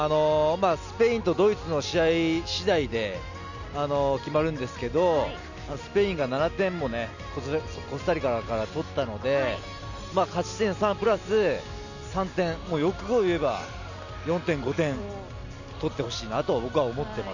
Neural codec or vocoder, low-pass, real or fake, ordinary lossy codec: none; 7.2 kHz; real; none